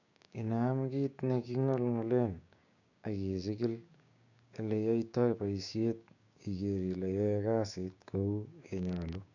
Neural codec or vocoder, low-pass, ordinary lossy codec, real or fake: codec, 16 kHz, 6 kbps, DAC; 7.2 kHz; none; fake